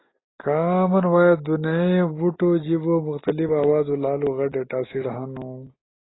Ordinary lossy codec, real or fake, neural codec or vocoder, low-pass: AAC, 16 kbps; real; none; 7.2 kHz